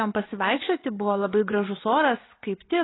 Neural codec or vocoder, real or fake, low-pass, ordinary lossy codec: vocoder, 24 kHz, 100 mel bands, Vocos; fake; 7.2 kHz; AAC, 16 kbps